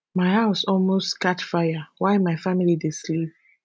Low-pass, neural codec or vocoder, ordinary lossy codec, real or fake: none; none; none; real